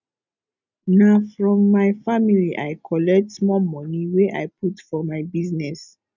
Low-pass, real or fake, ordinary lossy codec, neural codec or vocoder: 7.2 kHz; real; none; none